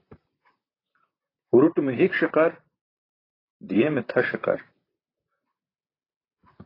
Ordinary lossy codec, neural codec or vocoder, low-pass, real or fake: AAC, 24 kbps; vocoder, 44.1 kHz, 128 mel bands, Pupu-Vocoder; 5.4 kHz; fake